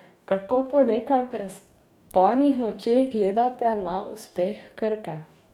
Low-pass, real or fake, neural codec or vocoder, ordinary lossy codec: 19.8 kHz; fake; codec, 44.1 kHz, 2.6 kbps, DAC; none